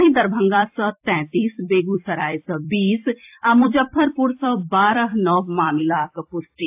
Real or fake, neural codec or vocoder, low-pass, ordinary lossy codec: fake; vocoder, 44.1 kHz, 128 mel bands every 256 samples, BigVGAN v2; 3.6 kHz; AAC, 32 kbps